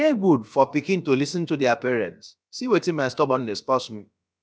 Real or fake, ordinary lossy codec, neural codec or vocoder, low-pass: fake; none; codec, 16 kHz, about 1 kbps, DyCAST, with the encoder's durations; none